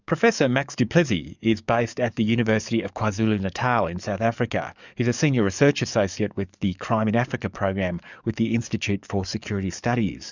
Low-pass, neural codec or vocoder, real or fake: 7.2 kHz; codec, 44.1 kHz, 7.8 kbps, DAC; fake